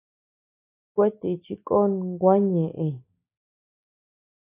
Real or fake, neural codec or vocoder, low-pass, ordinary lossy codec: real; none; 3.6 kHz; AAC, 24 kbps